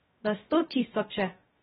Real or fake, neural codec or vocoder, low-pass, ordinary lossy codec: fake; codec, 16 kHz, 0.2 kbps, FocalCodec; 7.2 kHz; AAC, 16 kbps